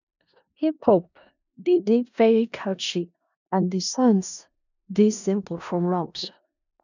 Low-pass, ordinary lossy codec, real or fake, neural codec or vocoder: 7.2 kHz; none; fake; codec, 16 kHz in and 24 kHz out, 0.4 kbps, LongCat-Audio-Codec, four codebook decoder